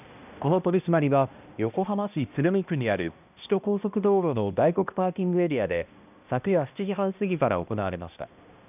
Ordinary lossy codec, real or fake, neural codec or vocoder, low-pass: none; fake; codec, 16 kHz, 1 kbps, X-Codec, HuBERT features, trained on balanced general audio; 3.6 kHz